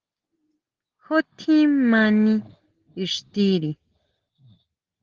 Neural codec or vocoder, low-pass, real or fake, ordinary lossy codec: none; 7.2 kHz; real; Opus, 16 kbps